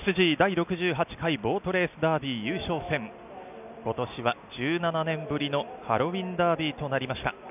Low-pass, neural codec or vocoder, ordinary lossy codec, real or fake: 3.6 kHz; none; none; real